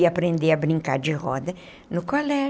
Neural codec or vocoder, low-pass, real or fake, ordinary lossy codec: none; none; real; none